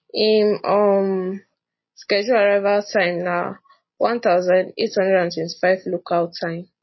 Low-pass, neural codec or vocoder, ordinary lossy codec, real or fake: 7.2 kHz; none; MP3, 24 kbps; real